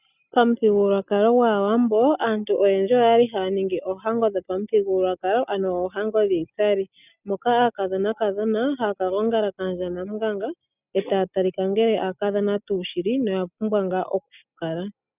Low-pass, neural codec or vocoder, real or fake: 3.6 kHz; none; real